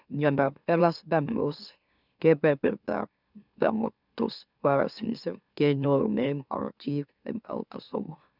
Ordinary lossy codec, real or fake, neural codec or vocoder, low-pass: none; fake; autoencoder, 44.1 kHz, a latent of 192 numbers a frame, MeloTTS; 5.4 kHz